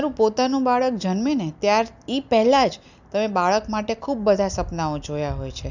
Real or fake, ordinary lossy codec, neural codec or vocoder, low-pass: real; none; none; 7.2 kHz